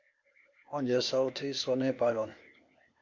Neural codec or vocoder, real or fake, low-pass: codec, 16 kHz, 0.8 kbps, ZipCodec; fake; 7.2 kHz